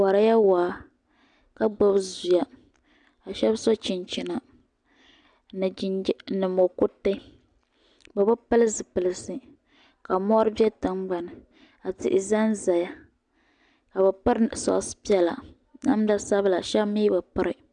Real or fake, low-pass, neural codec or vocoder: real; 9.9 kHz; none